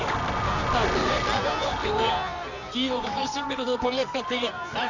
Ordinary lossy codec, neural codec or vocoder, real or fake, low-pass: none; codec, 24 kHz, 0.9 kbps, WavTokenizer, medium music audio release; fake; 7.2 kHz